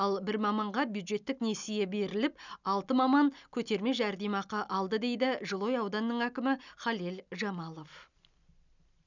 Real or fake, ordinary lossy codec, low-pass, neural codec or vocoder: real; none; 7.2 kHz; none